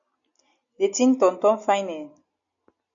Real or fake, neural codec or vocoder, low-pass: real; none; 7.2 kHz